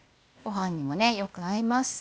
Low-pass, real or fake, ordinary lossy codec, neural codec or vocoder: none; fake; none; codec, 16 kHz, 0.8 kbps, ZipCodec